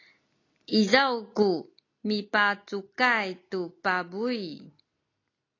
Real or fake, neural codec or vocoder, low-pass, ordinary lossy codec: real; none; 7.2 kHz; AAC, 32 kbps